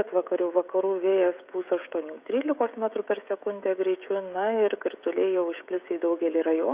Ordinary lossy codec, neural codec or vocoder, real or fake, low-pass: Opus, 64 kbps; codec, 16 kHz, 16 kbps, FreqCodec, smaller model; fake; 3.6 kHz